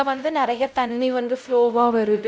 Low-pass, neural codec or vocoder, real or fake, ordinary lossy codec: none; codec, 16 kHz, 0.5 kbps, X-Codec, WavLM features, trained on Multilingual LibriSpeech; fake; none